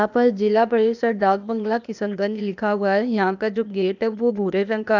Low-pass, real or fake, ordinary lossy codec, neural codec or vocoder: 7.2 kHz; fake; none; codec, 16 kHz, 0.8 kbps, ZipCodec